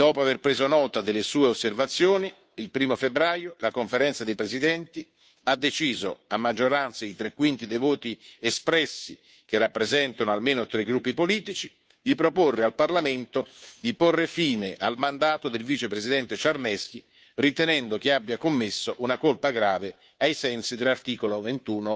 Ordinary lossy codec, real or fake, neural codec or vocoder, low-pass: none; fake; codec, 16 kHz, 2 kbps, FunCodec, trained on Chinese and English, 25 frames a second; none